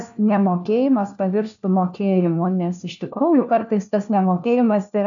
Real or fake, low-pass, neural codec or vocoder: fake; 7.2 kHz; codec, 16 kHz, 1 kbps, FunCodec, trained on LibriTTS, 50 frames a second